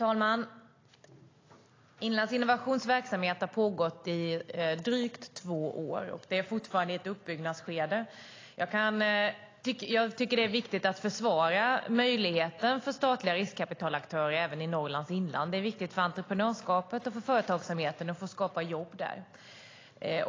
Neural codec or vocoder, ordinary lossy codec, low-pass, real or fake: none; AAC, 32 kbps; 7.2 kHz; real